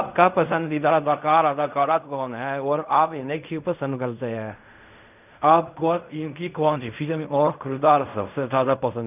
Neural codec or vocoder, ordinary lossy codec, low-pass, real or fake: codec, 16 kHz in and 24 kHz out, 0.4 kbps, LongCat-Audio-Codec, fine tuned four codebook decoder; none; 3.6 kHz; fake